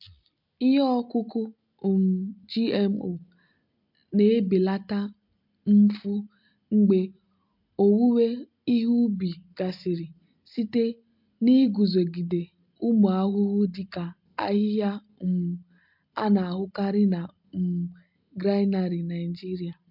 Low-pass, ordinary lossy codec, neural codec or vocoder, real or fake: 5.4 kHz; MP3, 48 kbps; none; real